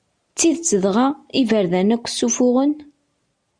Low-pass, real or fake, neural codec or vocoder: 9.9 kHz; real; none